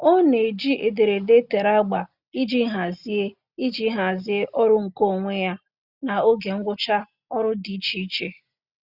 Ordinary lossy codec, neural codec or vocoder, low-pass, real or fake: Opus, 64 kbps; none; 5.4 kHz; real